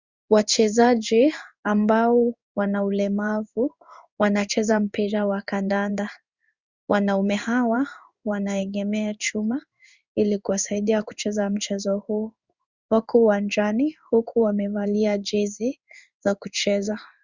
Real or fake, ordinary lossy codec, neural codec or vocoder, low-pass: fake; Opus, 64 kbps; codec, 16 kHz in and 24 kHz out, 1 kbps, XY-Tokenizer; 7.2 kHz